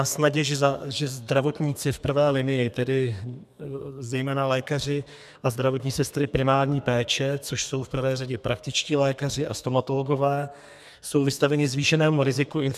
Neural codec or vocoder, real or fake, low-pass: codec, 32 kHz, 1.9 kbps, SNAC; fake; 14.4 kHz